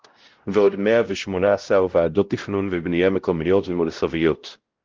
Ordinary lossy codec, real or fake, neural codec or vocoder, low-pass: Opus, 16 kbps; fake; codec, 16 kHz, 0.5 kbps, X-Codec, WavLM features, trained on Multilingual LibriSpeech; 7.2 kHz